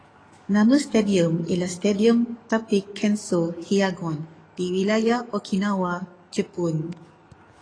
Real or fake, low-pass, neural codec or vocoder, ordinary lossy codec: fake; 9.9 kHz; codec, 44.1 kHz, 7.8 kbps, DAC; AAC, 32 kbps